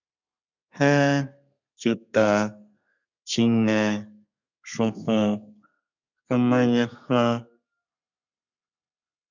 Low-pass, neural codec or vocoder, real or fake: 7.2 kHz; codec, 32 kHz, 1.9 kbps, SNAC; fake